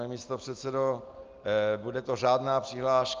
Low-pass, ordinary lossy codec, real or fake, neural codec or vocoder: 7.2 kHz; Opus, 24 kbps; real; none